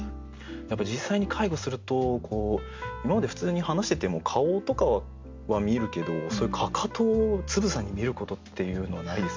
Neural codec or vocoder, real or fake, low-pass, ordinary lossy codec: none; real; 7.2 kHz; MP3, 64 kbps